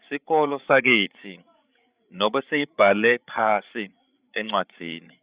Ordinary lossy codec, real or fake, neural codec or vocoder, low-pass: Opus, 64 kbps; fake; codec, 16 kHz, 8 kbps, FreqCodec, larger model; 3.6 kHz